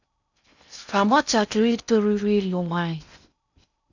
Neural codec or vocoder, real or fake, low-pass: codec, 16 kHz in and 24 kHz out, 0.6 kbps, FocalCodec, streaming, 2048 codes; fake; 7.2 kHz